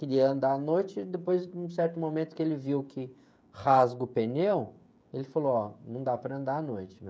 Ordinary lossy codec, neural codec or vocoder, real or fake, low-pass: none; codec, 16 kHz, 16 kbps, FreqCodec, smaller model; fake; none